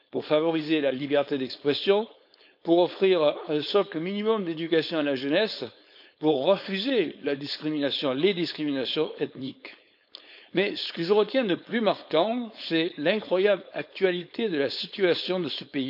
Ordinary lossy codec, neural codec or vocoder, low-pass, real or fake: none; codec, 16 kHz, 4.8 kbps, FACodec; 5.4 kHz; fake